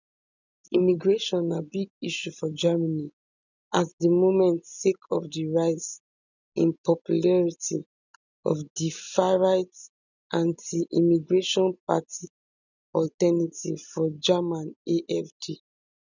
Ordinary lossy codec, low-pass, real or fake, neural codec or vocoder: none; 7.2 kHz; real; none